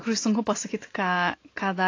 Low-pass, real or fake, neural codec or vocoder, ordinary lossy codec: 7.2 kHz; real; none; AAC, 32 kbps